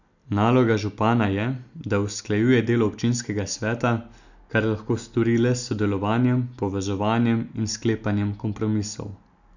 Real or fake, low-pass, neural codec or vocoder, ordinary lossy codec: real; 7.2 kHz; none; none